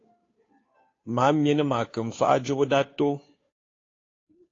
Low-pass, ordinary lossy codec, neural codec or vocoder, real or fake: 7.2 kHz; AAC, 32 kbps; codec, 16 kHz, 2 kbps, FunCodec, trained on Chinese and English, 25 frames a second; fake